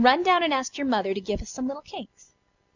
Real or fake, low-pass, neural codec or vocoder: real; 7.2 kHz; none